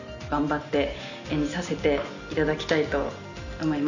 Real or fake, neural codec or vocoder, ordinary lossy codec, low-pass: real; none; none; 7.2 kHz